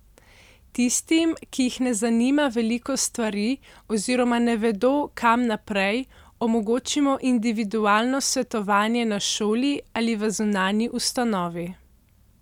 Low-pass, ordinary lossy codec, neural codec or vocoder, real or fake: 19.8 kHz; none; none; real